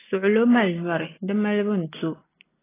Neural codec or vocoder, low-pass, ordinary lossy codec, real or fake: none; 3.6 kHz; AAC, 16 kbps; real